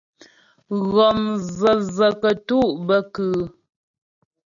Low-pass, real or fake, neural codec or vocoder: 7.2 kHz; real; none